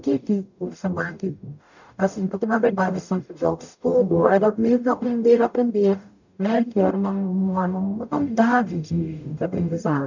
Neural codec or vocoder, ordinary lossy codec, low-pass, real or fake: codec, 44.1 kHz, 0.9 kbps, DAC; none; 7.2 kHz; fake